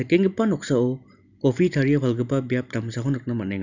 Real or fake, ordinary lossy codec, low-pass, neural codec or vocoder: real; none; 7.2 kHz; none